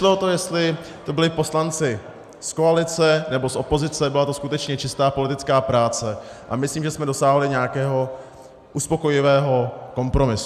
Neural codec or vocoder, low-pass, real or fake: none; 14.4 kHz; real